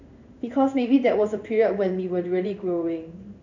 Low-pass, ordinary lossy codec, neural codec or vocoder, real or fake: 7.2 kHz; none; codec, 16 kHz in and 24 kHz out, 1 kbps, XY-Tokenizer; fake